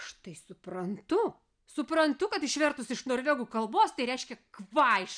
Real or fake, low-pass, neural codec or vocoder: real; 9.9 kHz; none